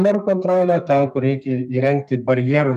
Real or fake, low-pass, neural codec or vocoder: fake; 14.4 kHz; codec, 32 kHz, 1.9 kbps, SNAC